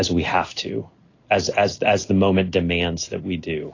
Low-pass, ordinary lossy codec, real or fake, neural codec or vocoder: 7.2 kHz; AAC, 32 kbps; real; none